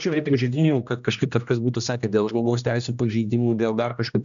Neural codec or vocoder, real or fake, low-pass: codec, 16 kHz, 1 kbps, X-Codec, HuBERT features, trained on general audio; fake; 7.2 kHz